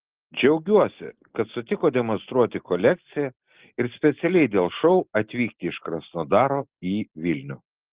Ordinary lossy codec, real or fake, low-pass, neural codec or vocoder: Opus, 16 kbps; real; 3.6 kHz; none